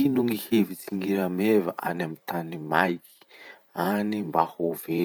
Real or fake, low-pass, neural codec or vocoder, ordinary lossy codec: fake; none; vocoder, 44.1 kHz, 128 mel bands every 512 samples, BigVGAN v2; none